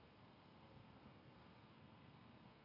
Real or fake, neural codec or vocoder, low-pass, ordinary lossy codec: fake; codec, 32 kHz, 1.9 kbps, SNAC; 5.4 kHz; none